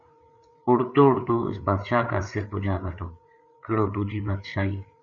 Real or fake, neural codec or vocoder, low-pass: fake; codec, 16 kHz, 8 kbps, FreqCodec, larger model; 7.2 kHz